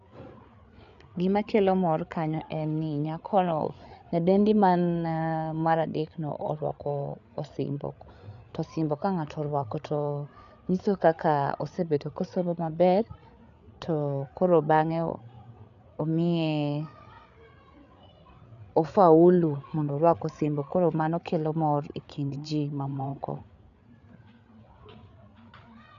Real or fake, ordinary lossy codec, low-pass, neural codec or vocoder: fake; none; 7.2 kHz; codec, 16 kHz, 8 kbps, FreqCodec, larger model